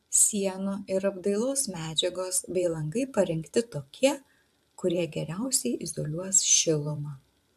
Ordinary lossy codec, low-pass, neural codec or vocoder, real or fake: AAC, 96 kbps; 14.4 kHz; vocoder, 44.1 kHz, 128 mel bands every 512 samples, BigVGAN v2; fake